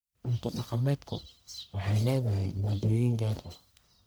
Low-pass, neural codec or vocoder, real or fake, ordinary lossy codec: none; codec, 44.1 kHz, 1.7 kbps, Pupu-Codec; fake; none